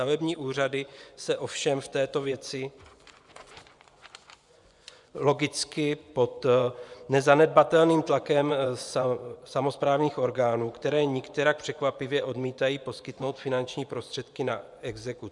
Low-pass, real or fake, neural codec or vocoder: 10.8 kHz; fake; vocoder, 24 kHz, 100 mel bands, Vocos